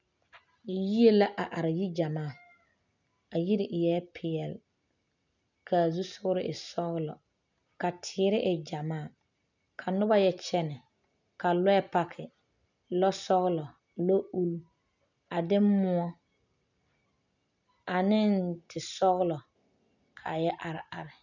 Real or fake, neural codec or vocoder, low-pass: real; none; 7.2 kHz